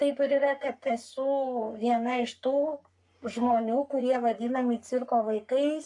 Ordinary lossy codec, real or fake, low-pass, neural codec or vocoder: AAC, 64 kbps; fake; 10.8 kHz; codec, 44.1 kHz, 3.4 kbps, Pupu-Codec